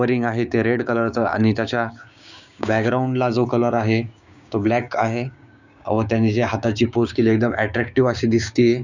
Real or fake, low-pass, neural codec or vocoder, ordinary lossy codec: fake; 7.2 kHz; codec, 16 kHz, 6 kbps, DAC; none